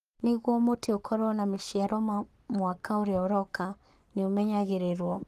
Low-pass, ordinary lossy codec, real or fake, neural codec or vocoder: 14.4 kHz; Opus, 24 kbps; fake; codec, 44.1 kHz, 7.8 kbps, Pupu-Codec